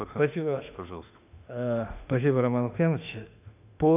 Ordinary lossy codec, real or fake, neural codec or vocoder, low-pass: none; fake; autoencoder, 48 kHz, 32 numbers a frame, DAC-VAE, trained on Japanese speech; 3.6 kHz